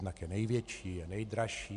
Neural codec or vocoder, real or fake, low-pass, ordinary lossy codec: none; real; 10.8 kHz; MP3, 64 kbps